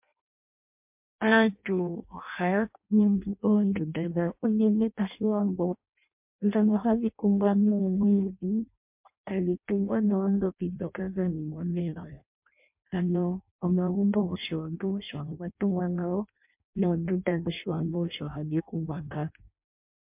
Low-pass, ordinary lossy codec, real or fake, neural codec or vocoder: 3.6 kHz; MP3, 32 kbps; fake; codec, 16 kHz in and 24 kHz out, 0.6 kbps, FireRedTTS-2 codec